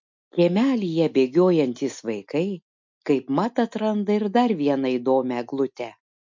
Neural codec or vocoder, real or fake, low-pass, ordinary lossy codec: none; real; 7.2 kHz; MP3, 64 kbps